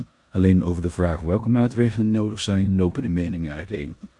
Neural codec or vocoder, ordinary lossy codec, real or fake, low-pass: codec, 16 kHz in and 24 kHz out, 0.9 kbps, LongCat-Audio-Codec, four codebook decoder; AAC, 64 kbps; fake; 10.8 kHz